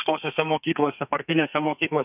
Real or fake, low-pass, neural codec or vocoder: fake; 3.6 kHz; codec, 32 kHz, 1.9 kbps, SNAC